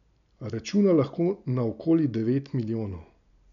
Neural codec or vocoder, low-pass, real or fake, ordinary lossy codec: none; 7.2 kHz; real; none